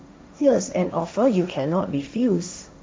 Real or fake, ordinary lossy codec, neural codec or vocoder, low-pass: fake; none; codec, 16 kHz, 1.1 kbps, Voila-Tokenizer; none